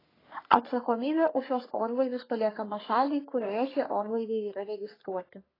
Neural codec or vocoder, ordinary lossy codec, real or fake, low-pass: codec, 44.1 kHz, 3.4 kbps, Pupu-Codec; AAC, 24 kbps; fake; 5.4 kHz